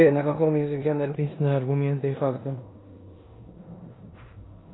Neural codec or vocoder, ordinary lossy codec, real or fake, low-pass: codec, 16 kHz in and 24 kHz out, 0.9 kbps, LongCat-Audio-Codec, four codebook decoder; AAC, 16 kbps; fake; 7.2 kHz